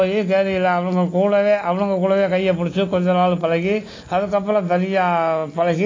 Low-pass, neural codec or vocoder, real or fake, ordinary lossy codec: 7.2 kHz; none; real; AAC, 32 kbps